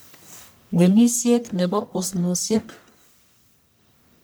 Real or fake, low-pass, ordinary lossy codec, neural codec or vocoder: fake; none; none; codec, 44.1 kHz, 1.7 kbps, Pupu-Codec